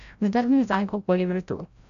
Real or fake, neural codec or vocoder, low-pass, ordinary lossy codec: fake; codec, 16 kHz, 0.5 kbps, FreqCodec, larger model; 7.2 kHz; none